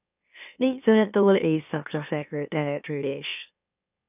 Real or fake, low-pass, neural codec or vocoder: fake; 3.6 kHz; autoencoder, 44.1 kHz, a latent of 192 numbers a frame, MeloTTS